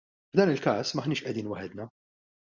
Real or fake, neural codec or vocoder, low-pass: real; none; 7.2 kHz